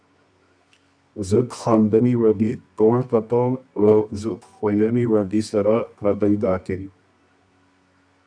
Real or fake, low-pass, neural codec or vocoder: fake; 9.9 kHz; codec, 24 kHz, 0.9 kbps, WavTokenizer, medium music audio release